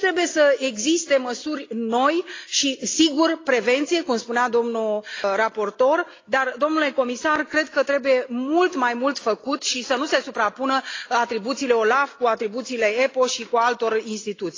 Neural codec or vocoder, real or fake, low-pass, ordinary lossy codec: none; real; 7.2 kHz; AAC, 32 kbps